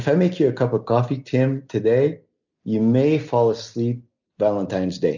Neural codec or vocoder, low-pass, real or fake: none; 7.2 kHz; real